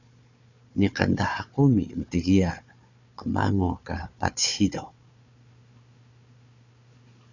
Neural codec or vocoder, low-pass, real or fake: codec, 16 kHz, 4 kbps, FunCodec, trained on Chinese and English, 50 frames a second; 7.2 kHz; fake